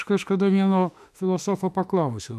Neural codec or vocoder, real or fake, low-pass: autoencoder, 48 kHz, 32 numbers a frame, DAC-VAE, trained on Japanese speech; fake; 14.4 kHz